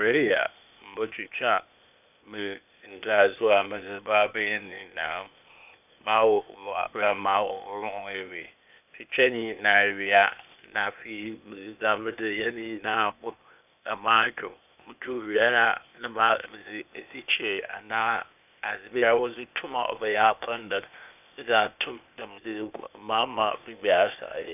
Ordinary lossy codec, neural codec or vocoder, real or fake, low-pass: none; codec, 16 kHz, 0.8 kbps, ZipCodec; fake; 3.6 kHz